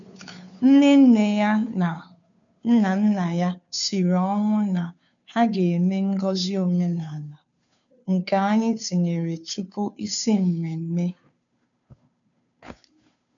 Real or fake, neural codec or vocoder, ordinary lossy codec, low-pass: fake; codec, 16 kHz, 2 kbps, FunCodec, trained on Chinese and English, 25 frames a second; none; 7.2 kHz